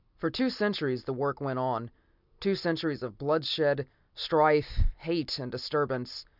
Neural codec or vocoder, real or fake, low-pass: none; real; 5.4 kHz